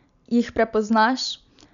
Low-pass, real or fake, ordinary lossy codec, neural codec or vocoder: 7.2 kHz; real; none; none